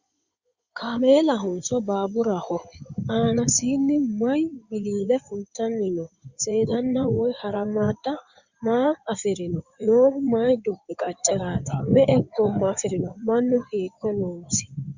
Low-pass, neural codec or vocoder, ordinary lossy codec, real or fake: 7.2 kHz; codec, 16 kHz in and 24 kHz out, 2.2 kbps, FireRedTTS-2 codec; Opus, 64 kbps; fake